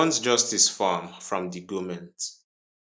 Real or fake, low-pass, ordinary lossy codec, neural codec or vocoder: real; none; none; none